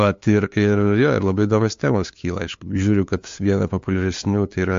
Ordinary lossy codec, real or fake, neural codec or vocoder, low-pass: MP3, 48 kbps; fake; codec, 16 kHz, 2 kbps, FunCodec, trained on LibriTTS, 25 frames a second; 7.2 kHz